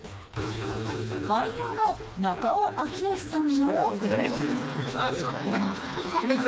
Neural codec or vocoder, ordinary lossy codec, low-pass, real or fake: codec, 16 kHz, 2 kbps, FreqCodec, smaller model; none; none; fake